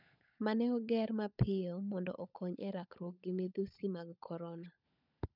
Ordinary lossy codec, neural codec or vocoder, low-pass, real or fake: none; codec, 16 kHz, 16 kbps, FunCodec, trained on LibriTTS, 50 frames a second; 5.4 kHz; fake